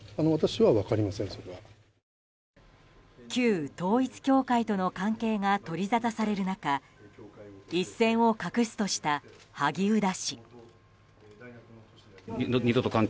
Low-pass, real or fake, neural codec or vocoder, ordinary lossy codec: none; real; none; none